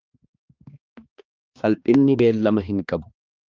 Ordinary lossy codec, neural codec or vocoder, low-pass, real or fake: Opus, 32 kbps; codec, 16 kHz, 2 kbps, X-Codec, HuBERT features, trained on balanced general audio; 7.2 kHz; fake